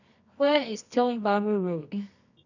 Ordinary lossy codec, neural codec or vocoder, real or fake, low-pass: none; codec, 24 kHz, 0.9 kbps, WavTokenizer, medium music audio release; fake; 7.2 kHz